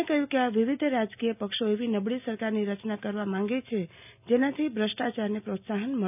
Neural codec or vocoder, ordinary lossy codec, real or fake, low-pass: none; none; real; 3.6 kHz